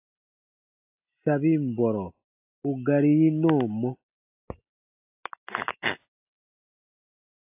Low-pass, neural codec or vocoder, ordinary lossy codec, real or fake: 3.6 kHz; none; AAC, 32 kbps; real